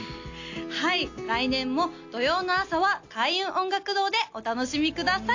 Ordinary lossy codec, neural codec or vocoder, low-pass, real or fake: AAC, 48 kbps; none; 7.2 kHz; real